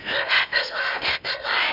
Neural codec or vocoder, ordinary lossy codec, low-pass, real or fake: codec, 16 kHz in and 24 kHz out, 0.8 kbps, FocalCodec, streaming, 65536 codes; none; 5.4 kHz; fake